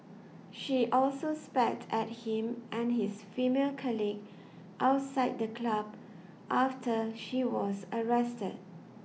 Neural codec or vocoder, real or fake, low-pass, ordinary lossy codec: none; real; none; none